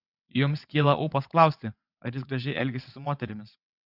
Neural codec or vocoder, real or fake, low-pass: vocoder, 22.05 kHz, 80 mel bands, WaveNeXt; fake; 5.4 kHz